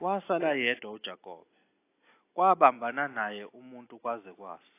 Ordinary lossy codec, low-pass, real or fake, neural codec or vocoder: AAC, 24 kbps; 3.6 kHz; real; none